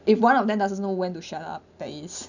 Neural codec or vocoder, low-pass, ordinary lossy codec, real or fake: none; 7.2 kHz; none; real